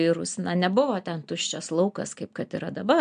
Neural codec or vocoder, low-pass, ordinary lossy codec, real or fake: none; 9.9 kHz; MP3, 64 kbps; real